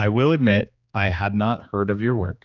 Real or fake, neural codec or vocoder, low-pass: fake; codec, 16 kHz, 2 kbps, X-Codec, HuBERT features, trained on general audio; 7.2 kHz